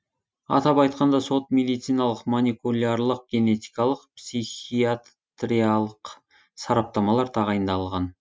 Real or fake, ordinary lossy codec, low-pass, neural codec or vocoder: real; none; none; none